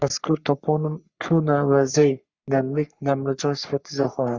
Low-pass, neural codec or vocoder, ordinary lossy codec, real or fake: 7.2 kHz; codec, 44.1 kHz, 3.4 kbps, Pupu-Codec; Opus, 64 kbps; fake